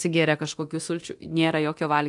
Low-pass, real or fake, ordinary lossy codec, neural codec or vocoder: 10.8 kHz; fake; AAC, 64 kbps; codec, 24 kHz, 0.9 kbps, DualCodec